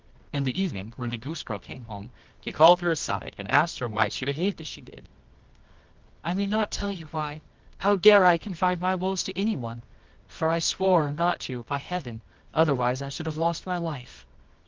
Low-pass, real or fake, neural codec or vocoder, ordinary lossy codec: 7.2 kHz; fake; codec, 24 kHz, 0.9 kbps, WavTokenizer, medium music audio release; Opus, 24 kbps